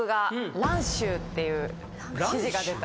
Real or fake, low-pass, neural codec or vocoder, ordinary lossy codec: real; none; none; none